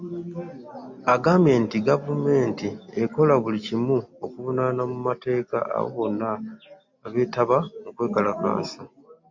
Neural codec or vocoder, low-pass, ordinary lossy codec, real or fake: none; 7.2 kHz; MP3, 48 kbps; real